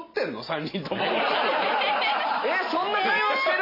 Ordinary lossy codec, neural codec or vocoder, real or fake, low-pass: MP3, 24 kbps; none; real; 5.4 kHz